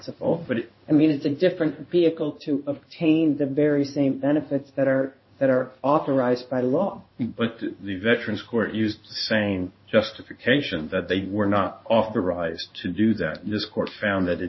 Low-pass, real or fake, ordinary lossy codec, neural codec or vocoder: 7.2 kHz; fake; MP3, 24 kbps; codec, 16 kHz in and 24 kHz out, 1 kbps, XY-Tokenizer